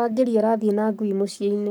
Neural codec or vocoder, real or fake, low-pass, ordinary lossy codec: codec, 44.1 kHz, 7.8 kbps, Pupu-Codec; fake; none; none